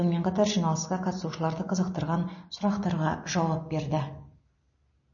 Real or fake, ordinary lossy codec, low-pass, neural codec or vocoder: real; MP3, 32 kbps; 7.2 kHz; none